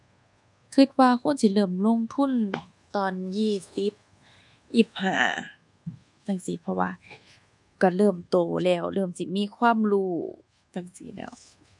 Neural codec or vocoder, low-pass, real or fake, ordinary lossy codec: codec, 24 kHz, 1.2 kbps, DualCodec; 10.8 kHz; fake; none